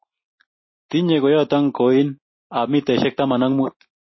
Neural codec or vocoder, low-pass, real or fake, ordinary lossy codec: none; 7.2 kHz; real; MP3, 24 kbps